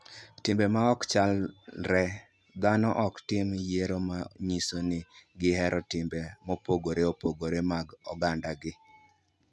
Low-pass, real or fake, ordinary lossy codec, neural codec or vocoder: none; real; none; none